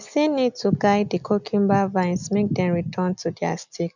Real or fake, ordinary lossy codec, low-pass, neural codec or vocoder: real; none; 7.2 kHz; none